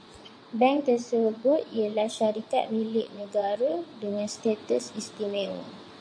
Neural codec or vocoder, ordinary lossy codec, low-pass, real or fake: vocoder, 22.05 kHz, 80 mel bands, WaveNeXt; MP3, 48 kbps; 9.9 kHz; fake